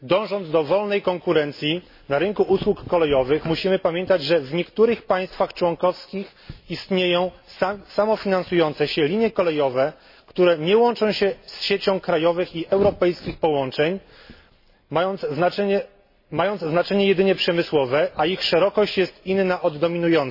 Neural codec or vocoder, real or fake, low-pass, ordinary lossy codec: none; real; 5.4 kHz; MP3, 24 kbps